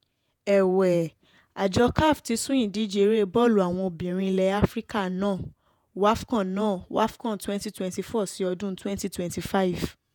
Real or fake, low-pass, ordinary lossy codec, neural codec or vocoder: fake; 19.8 kHz; none; vocoder, 48 kHz, 128 mel bands, Vocos